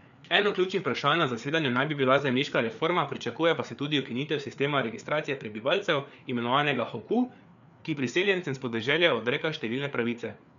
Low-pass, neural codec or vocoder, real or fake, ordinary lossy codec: 7.2 kHz; codec, 16 kHz, 4 kbps, FreqCodec, larger model; fake; none